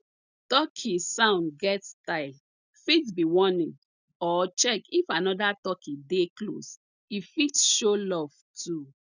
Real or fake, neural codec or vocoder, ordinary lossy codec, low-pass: real; none; none; 7.2 kHz